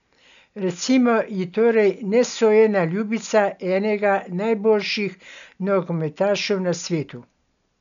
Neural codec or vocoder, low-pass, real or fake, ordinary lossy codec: none; 7.2 kHz; real; none